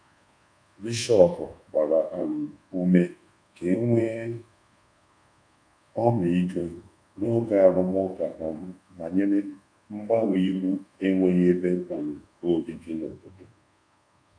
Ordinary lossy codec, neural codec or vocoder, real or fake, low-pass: none; codec, 24 kHz, 1.2 kbps, DualCodec; fake; 9.9 kHz